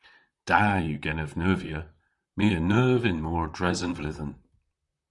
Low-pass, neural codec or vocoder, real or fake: 10.8 kHz; vocoder, 44.1 kHz, 128 mel bands, Pupu-Vocoder; fake